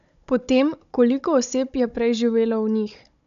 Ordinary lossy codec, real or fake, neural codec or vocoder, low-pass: none; fake; codec, 16 kHz, 16 kbps, FunCodec, trained on Chinese and English, 50 frames a second; 7.2 kHz